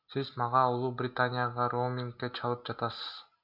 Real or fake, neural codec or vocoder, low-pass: real; none; 5.4 kHz